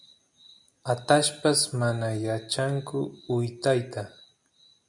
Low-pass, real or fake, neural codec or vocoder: 10.8 kHz; real; none